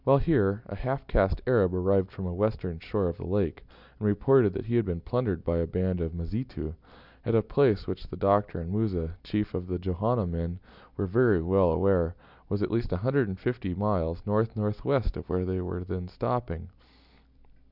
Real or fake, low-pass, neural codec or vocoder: real; 5.4 kHz; none